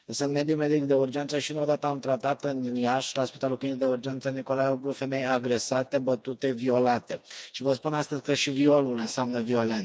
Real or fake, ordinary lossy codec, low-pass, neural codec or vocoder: fake; none; none; codec, 16 kHz, 2 kbps, FreqCodec, smaller model